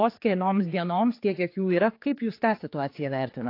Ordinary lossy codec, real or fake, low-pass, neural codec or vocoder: AAC, 32 kbps; fake; 5.4 kHz; codec, 16 kHz, 4 kbps, X-Codec, HuBERT features, trained on general audio